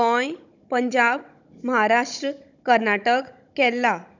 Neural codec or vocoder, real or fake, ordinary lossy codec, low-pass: codec, 16 kHz, 16 kbps, FunCodec, trained on Chinese and English, 50 frames a second; fake; none; 7.2 kHz